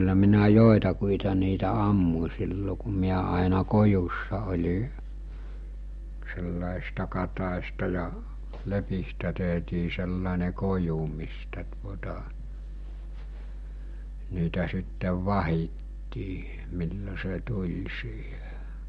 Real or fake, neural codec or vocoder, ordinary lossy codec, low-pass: real; none; MP3, 48 kbps; 14.4 kHz